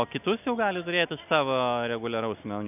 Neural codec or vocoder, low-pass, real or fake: none; 3.6 kHz; real